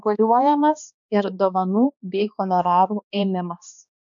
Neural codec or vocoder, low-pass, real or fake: codec, 16 kHz, 2 kbps, X-Codec, HuBERT features, trained on balanced general audio; 7.2 kHz; fake